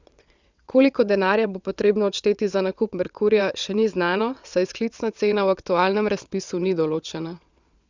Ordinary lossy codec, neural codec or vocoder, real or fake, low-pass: Opus, 64 kbps; vocoder, 22.05 kHz, 80 mel bands, WaveNeXt; fake; 7.2 kHz